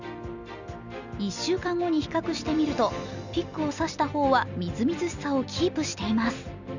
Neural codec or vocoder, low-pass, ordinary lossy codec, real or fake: none; 7.2 kHz; none; real